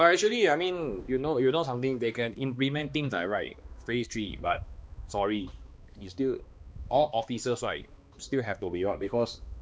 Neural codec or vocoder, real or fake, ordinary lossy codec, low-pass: codec, 16 kHz, 2 kbps, X-Codec, HuBERT features, trained on balanced general audio; fake; none; none